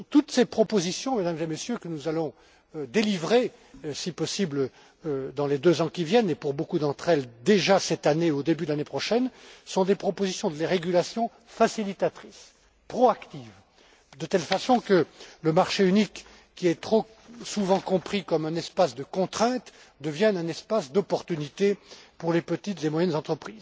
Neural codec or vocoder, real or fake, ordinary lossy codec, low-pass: none; real; none; none